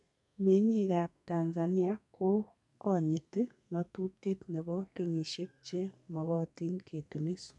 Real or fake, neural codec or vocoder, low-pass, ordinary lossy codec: fake; codec, 32 kHz, 1.9 kbps, SNAC; 10.8 kHz; none